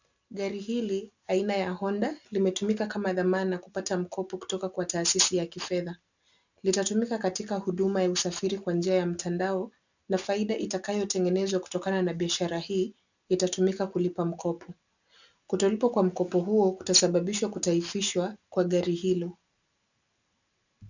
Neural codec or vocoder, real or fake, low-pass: none; real; 7.2 kHz